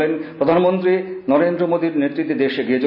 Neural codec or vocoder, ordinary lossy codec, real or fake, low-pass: none; none; real; 5.4 kHz